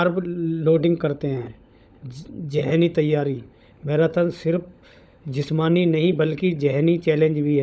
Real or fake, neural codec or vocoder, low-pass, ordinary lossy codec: fake; codec, 16 kHz, 16 kbps, FunCodec, trained on LibriTTS, 50 frames a second; none; none